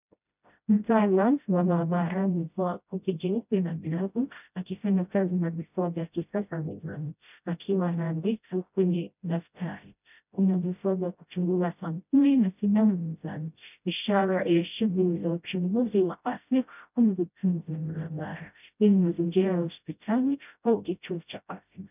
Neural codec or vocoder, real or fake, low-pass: codec, 16 kHz, 0.5 kbps, FreqCodec, smaller model; fake; 3.6 kHz